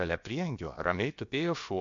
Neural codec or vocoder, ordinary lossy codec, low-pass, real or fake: codec, 16 kHz, about 1 kbps, DyCAST, with the encoder's durations; MP3, 48 kbps; 7.2 kHz; fake